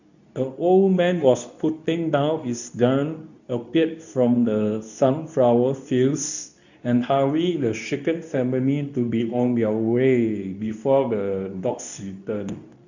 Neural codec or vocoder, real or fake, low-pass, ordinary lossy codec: codec, 24 kHz, 0.9 kbps, WavTokenizer, medium speech release version 2; fake; 7.2 kHz; none